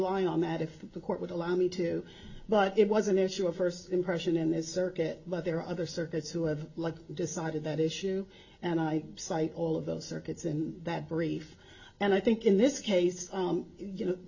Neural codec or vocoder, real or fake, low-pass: none; real; 7.2 kHz